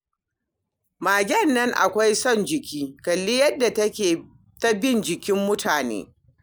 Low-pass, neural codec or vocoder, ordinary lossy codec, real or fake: none; none; none; real